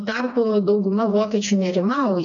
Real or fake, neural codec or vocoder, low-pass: fake; codec, 16 kHz, 2 kbps, FreqCodec, smaller model; 7.2 kHz